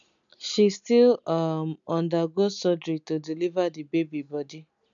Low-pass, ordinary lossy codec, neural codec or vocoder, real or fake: 7.2 kHz; none; none; real